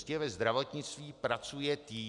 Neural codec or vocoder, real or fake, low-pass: none; real; 10.8 kHz